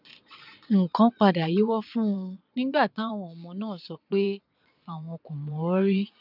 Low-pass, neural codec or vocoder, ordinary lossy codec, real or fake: 5.4 kHz; vocoder, 22.05 kHz, 80 mel bands, Vocos; none; fake